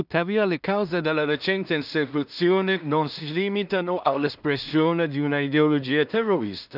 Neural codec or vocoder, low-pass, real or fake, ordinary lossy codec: codec, 16 kHz in and 24 kHz out, 0.4 kbps, LongCat-Audio-Codec, two codebook decoder; 5.4 kHz; fake; none